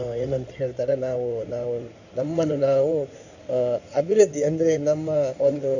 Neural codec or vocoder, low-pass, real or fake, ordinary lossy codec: codec, 16 kHz in and 24 kHz out, 2.2 kbps, FireRedTTS-2 codec; 7.2 kHz; fake; none